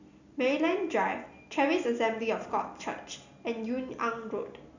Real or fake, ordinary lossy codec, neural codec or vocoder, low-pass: real; none; none; 7.2 kHz